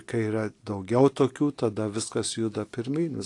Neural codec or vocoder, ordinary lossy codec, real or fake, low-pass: none; AAC, 48 kbps; real; 10.8 kHz